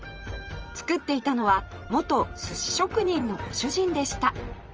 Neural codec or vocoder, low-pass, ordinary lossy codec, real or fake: vocoder, 44.1 kHz, 128 mel bands, Pupu-Vocoder; 7.2 kHz; Opus, 24 kbps; fake